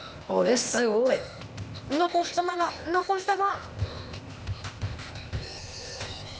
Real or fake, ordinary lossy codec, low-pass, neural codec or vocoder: fake; none; none; codec, 16 kHz, 0.8 kbps, ZipCodec